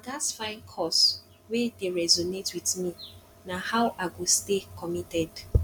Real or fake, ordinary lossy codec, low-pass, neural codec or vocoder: real; none; 19.8 kHz; none